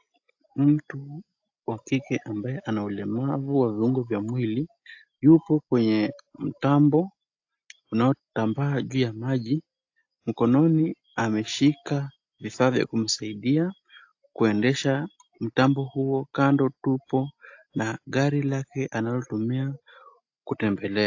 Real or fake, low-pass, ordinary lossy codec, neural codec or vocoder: real; 7.2 kHz; AAC, 48 kbps; none